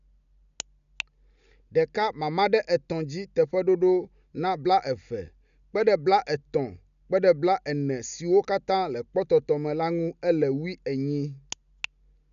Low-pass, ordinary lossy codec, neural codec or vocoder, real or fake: 7.2 kHz; none; none; real